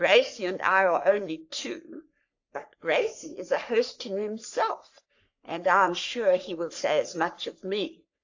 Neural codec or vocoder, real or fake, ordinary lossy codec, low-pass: codec, 44.1 kHz, 3.4 kbps, Pupu-Codec; fake; AAC, 48 kbps; 7.2 kHz